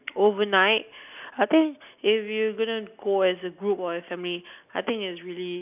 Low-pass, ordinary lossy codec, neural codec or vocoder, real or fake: 3.6 kHz; none; none; real